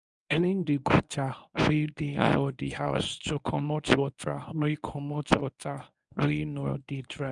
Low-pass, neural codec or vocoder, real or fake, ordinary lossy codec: 10.8 kHz; codec, 24 kHz, 0.9 kbps, WavTokenizer, medium speech release version 1; fake; none